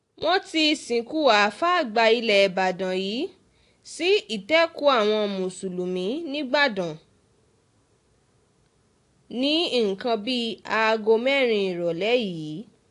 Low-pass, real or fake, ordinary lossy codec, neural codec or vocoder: 10.8 kHz; real; AAC, 48 kbps; none